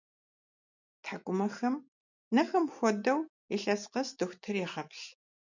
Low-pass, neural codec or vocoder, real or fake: 7.2 kHz; none; real